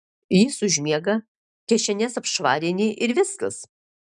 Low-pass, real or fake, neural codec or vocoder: 10.8 kHz; real; none